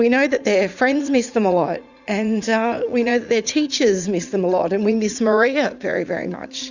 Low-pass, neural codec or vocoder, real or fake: 7.2 kHz; vocoder, 44.1 kHz, 80 mel bands, Vocos; fake